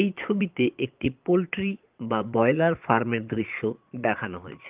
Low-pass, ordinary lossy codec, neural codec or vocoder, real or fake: 3.6 kHz; Opus, 32 kbps; vocoder, 22.05 kHz, 80 mel bands, Vocos; fake